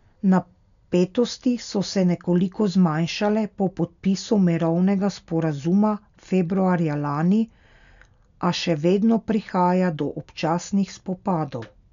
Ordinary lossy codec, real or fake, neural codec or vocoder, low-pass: none; real; none; 7.2 kHz